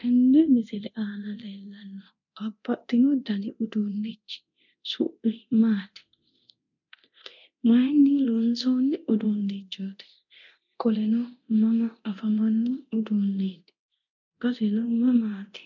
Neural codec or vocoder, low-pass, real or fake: codec, 24 kHz, 0.9 kbps, DualCodec; 7.2 kHz; fake